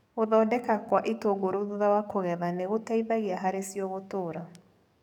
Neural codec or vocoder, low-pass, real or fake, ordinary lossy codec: codec, 44.1 kHz, 7.8 kbps, DAC; 19.8 kHz; fake; none